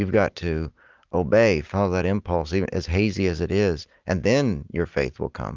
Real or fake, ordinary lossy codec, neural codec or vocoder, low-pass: real; Opus, 24 kbps; none; 7.2 kHz